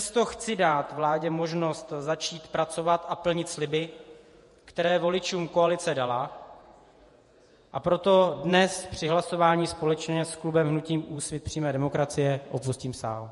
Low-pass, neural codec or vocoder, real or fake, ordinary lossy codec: 14.4 kHz; vocoder, 48 kHz, 128 mel bands, Vocos; fake; MP3, 48 kbps